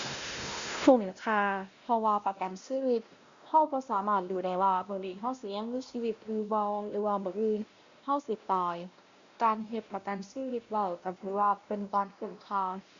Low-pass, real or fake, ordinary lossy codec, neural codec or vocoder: 7.2 kHz; fake; Opus, 64 kbps; codec, 16 kHz, 1 kbps, X-Codec, WavLM features, trained on Multilingual LibriSpeech